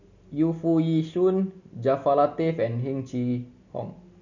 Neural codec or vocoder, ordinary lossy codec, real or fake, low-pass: none; none; real; 7.2 kHz